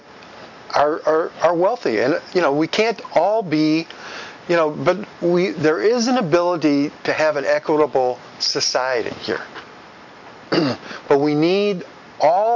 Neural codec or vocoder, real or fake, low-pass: none; real; 7.2 kHz